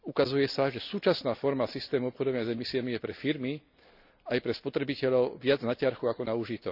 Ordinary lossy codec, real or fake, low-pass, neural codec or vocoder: none; real; 5.4 kHz; none